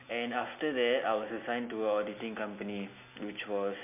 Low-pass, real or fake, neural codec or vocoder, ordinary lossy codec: 3.6 kHz; real; none; MP3, 32 kbps